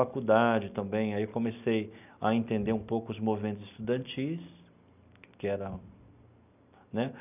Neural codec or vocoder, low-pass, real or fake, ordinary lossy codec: none; 3.6 kHz; real; none